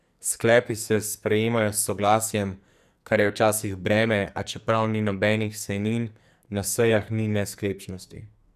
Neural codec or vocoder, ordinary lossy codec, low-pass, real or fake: codec, 44.1 kHz, 2.6 kbps, SNAC; none; 14.4 kHz; fake